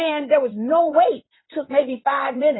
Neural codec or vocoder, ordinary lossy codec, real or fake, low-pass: codec, 16 kHz in and 24 kHz out, 2.2 kbps, FireRedTTS-2 codec; AAC, 16 kbps; fake; 7.2 kHz